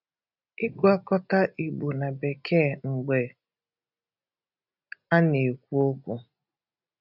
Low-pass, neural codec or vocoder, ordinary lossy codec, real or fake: 5.4 kHz; none; none; real